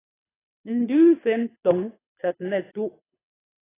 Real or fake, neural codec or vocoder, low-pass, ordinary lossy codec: fake; codec, 24 kHz, 3 kbps, HILCodec; 3.6 kHz; AAC, 16 kbps